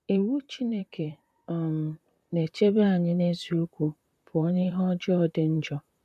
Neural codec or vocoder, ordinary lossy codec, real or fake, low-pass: vocoder, 44.1 kHz, 128 mel bands, Pupu-Vocoder; none; fake; 14.4 kHz